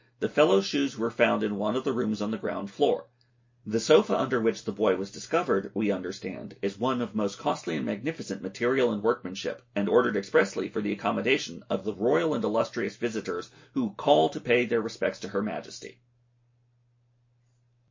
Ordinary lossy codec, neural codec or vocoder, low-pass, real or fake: MP3, 32 kbps; none; 7.2 kHz; real